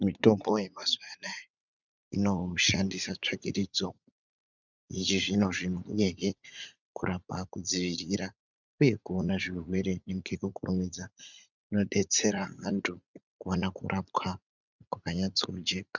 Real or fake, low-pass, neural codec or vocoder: fake; 7.2 kHz; vocoder, 44.1 kHz, 80 mel bands, Vocos